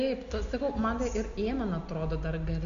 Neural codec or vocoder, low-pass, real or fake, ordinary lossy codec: none; 7.2 kHz; real; AAC, 64 kbps